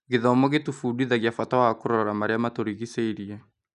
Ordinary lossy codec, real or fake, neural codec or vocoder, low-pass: none; real; none; 10.8 kHz